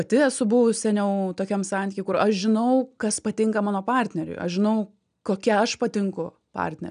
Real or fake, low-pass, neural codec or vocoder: real; 9.9 kHz; none